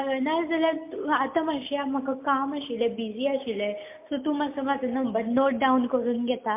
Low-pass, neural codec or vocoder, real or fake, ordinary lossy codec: 3.6 kHz; none; real; MP3, 32 kbps